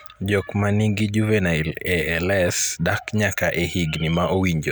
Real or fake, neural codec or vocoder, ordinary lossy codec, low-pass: real; none; none; none